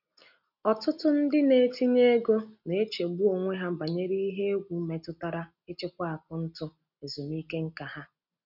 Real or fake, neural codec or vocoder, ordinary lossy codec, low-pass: real; none; none; 5.4 kHz